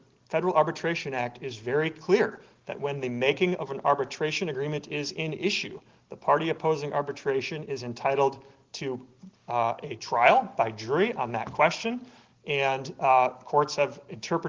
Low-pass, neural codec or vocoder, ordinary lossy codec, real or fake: 7.2 kHz; none; Opus, 16 kbps; real